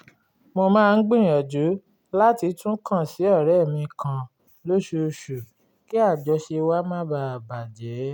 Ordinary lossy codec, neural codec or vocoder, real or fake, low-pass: none; none; real; 19.8 kHz